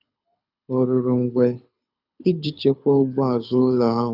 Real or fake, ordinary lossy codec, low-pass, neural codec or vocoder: fake; none; 5.4 kHz; codec, 24 kHz, 6 kbps, HILCodec